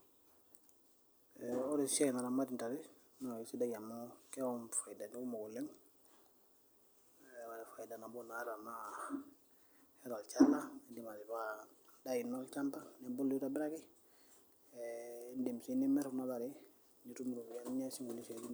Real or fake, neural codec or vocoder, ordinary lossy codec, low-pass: real; none; none; none